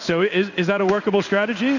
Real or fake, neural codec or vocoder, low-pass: fake; codec, 16 kHz in and 24 kHz out, 1 kbps, XY-Tokenizer; 7.2 kHz